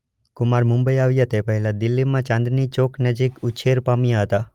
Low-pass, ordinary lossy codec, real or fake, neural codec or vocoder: 19.8 kHz; Opus, 32 kbps; real; none